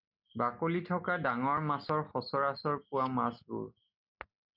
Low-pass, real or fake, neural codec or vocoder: 5.4 kHz; real; none